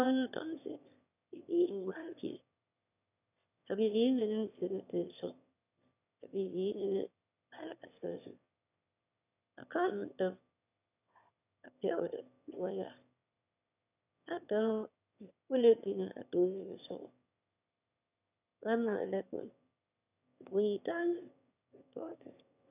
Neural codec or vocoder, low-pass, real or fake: autoencoder, 22.05 kHz, a latent of 192 numbers a frame, VITS, trained on one speaker; 3.6 kHz; fake